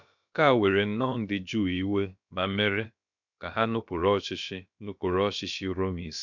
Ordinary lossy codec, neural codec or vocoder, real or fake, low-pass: none; codec, 16 kHz, about 1 kbps, DyCAST, with the encoder's durations; fake; 7.2 kHz